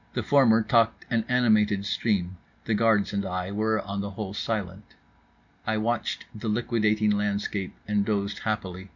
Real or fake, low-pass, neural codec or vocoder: real; 7.2 kHz; none